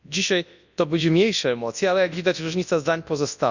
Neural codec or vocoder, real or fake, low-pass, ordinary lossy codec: codec, 24 kHz, 0.9 kbps, WavTokenizer, large speech release; fake; 7.2 kHz; none